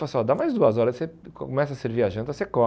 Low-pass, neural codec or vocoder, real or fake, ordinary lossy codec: none; none; real; none